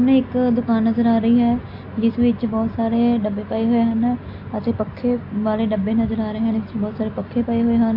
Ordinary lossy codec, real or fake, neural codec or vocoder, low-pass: AAC, 32 kbps; real; none; 5.4 kHz